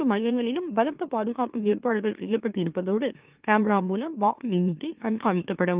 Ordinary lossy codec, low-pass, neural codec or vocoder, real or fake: Opus, 24 kbps; 3.6 kHz; autoencoder, 44.1 kHz, a latent of 192 numbers a frame, MeloTTS; fake